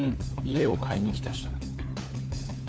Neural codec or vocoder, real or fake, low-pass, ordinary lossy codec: codec, 16 kHz, 4 kbps, FunCodec, trained on LibriTTS, 50 frames a second; fake; none; none